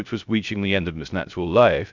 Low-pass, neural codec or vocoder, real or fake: 7.2 kHz; codec, 16 kHz, 0.3 kbps, FocalCodec; fake